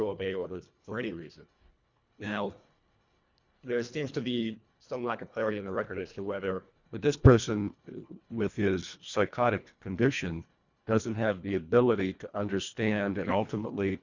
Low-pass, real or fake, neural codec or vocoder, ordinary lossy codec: 7.2 kHz; fake; codec, 24 kHz, 1.5 kbps, HILCodec; Opus, 64 kbps